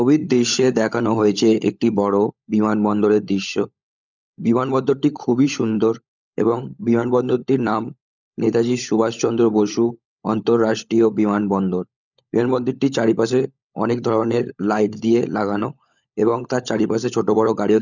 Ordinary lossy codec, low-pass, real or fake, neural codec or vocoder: none; 7.2 kHz; fake; codec, 16 kHz, 16 kbps, FunCodec, trained on LibriTTS, 50 frames a second